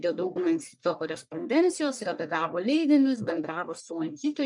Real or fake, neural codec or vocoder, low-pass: fake; codec, 44.1 kHz, 1.7 kbps, Pupu-Codec; 10.8 kHz